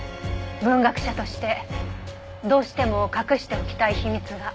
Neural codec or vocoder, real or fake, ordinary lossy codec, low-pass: none; real; none; none